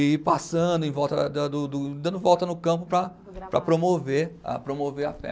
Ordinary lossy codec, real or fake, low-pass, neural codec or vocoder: none; real; none; none